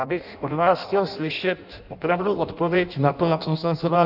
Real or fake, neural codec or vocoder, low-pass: fake; codec, 16 kHz in and 24 kHz out, 0.6 kbps, FireRedTTS-2 codec; 5.4 kHz